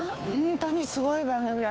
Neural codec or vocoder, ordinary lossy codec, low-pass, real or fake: codec, 16 kHz, 2 kbps, FunCodec, trained on Chinese and English, 25 frames a second; none; none; fake